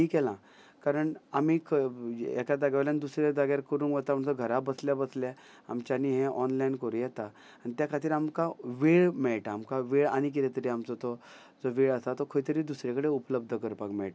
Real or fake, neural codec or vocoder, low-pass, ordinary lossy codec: real; none; none; none